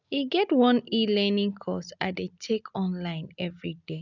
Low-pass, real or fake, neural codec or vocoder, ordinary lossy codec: 7.2 kHz; real; none; none